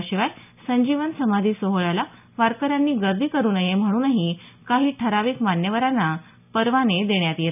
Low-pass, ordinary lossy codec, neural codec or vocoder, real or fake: 3.6 kHz; none; none; real